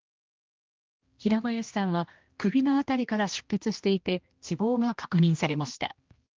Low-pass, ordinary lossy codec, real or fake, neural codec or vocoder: 7.2 kHz; Opus, 32 kbps; fake; codec, 16 kHz, 1 kbps, X-Codec, HuBERT features, trained on general audio